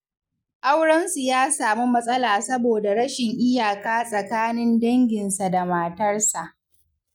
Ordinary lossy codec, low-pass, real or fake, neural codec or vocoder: none; 19.8 kHz; real; none